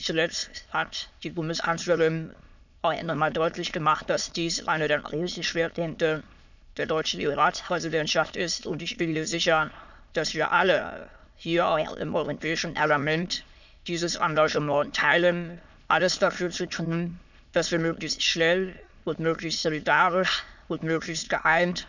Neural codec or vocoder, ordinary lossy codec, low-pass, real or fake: autoencoder, 22.05 kHz, a latent of 192 numbers a frame, VITS, trained on many speakers; none; 7.2 kHz; fake